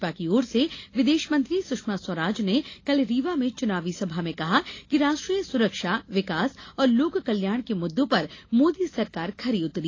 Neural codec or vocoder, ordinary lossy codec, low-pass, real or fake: none; AAC, 32 kbps; 7.2 kHz; real